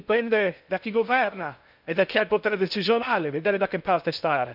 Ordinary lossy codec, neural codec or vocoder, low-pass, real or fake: none; codec, 16 kHz in and 24 kHz out, 0.6 kbps, FocalCodec, streaming, 2048 codes; 5.4 kHz; fake